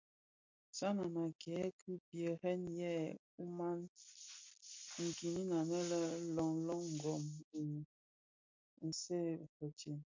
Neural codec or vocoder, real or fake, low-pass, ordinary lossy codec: none; real; 7.2 kHz; MP3, 48 kbps